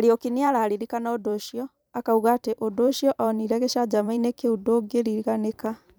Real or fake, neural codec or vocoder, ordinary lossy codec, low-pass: fake; vocoder, 44.1 kHz, 128 mel bands every 512 samples, BigVGAN v2; none; none